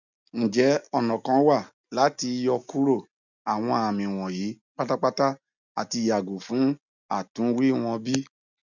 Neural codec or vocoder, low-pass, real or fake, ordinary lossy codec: none; 7.2 kHz; real; none